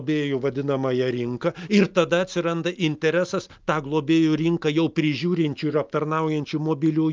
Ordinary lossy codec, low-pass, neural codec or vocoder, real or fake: Opus, 24 kbps; 7.2 kHz; none; real